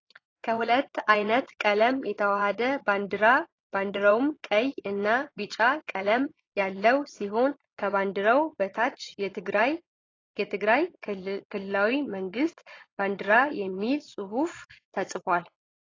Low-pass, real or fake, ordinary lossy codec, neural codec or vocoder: 7.2 kHz; fake; AAC, 32 kbps; vocoder, 22.05 kHz, 80 mel bands, Vocos